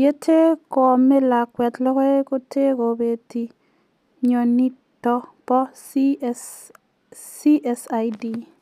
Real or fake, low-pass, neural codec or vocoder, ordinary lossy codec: real; 14.4 kHz; none; none